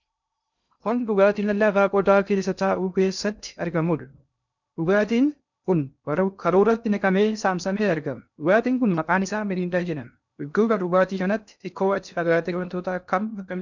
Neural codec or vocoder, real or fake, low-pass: codec, 16 kHz in and 24 kHz out, 0.6 kbps, FocalCodec, streaming, 2048 codes; fake; 7.2 kHz